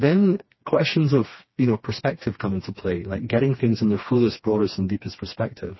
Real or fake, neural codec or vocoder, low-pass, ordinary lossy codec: fake; codec, 16 kHz, 2 kbps, FreqCodec, smaller model; 7.2 kHz; MP3, 24 kbps